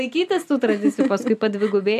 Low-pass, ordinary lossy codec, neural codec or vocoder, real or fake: 14.4 kHz; MP3, 96 kbps; none; real